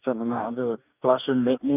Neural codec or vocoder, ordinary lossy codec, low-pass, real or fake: codec, 44.1 kHz, 2.6 kbps, DAC; none; 3.6 kHz; fake